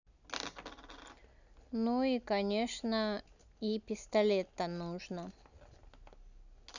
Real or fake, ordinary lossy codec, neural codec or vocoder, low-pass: real; none; none; 7.2 kHz